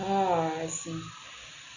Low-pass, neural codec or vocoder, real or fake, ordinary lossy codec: 7.2 kHz; none; real; none